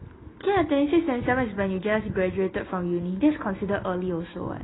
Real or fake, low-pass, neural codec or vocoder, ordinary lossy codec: real; 7.2 kHz; none; AAC, 16 kbps